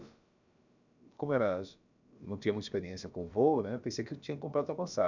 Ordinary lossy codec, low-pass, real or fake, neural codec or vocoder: none; 7.2 kHz; fake; codec, 16 kHz, about 1 kbps, DyCAST, with the encoder's durations